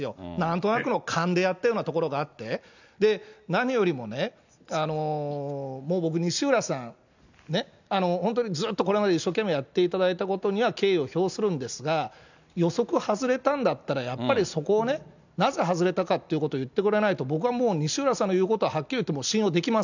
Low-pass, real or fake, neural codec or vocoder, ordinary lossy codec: 7.2 kHz; real; none; none